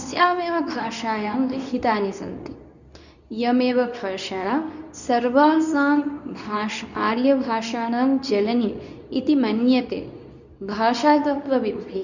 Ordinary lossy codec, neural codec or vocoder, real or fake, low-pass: none; codec, 24 kHz, 0.9 kbps, WavTokenizer, medium speech release version 2; fake; 7.2 kHz